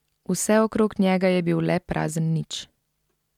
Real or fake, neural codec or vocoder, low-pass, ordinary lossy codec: real; none; 19.8 kHz; MP3, 96 kbps